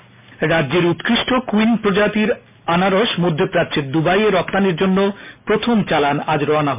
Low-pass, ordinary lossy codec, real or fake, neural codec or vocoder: 3.6 kHz; MP3, 32 kbps; real; none